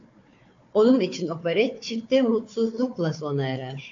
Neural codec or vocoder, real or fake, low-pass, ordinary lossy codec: codec, 16 kHz, 4 kbps, FunCodec, trained on Chinese and English, 50 frames a second; fake; 7.2 kHz; AAC, 48 kbps